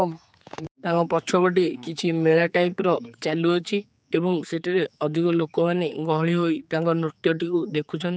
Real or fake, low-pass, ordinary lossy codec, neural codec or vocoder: fake; none; none; codec, 16 kHz, 4 kbps, X-Codec, HuBERT features, trained on general audio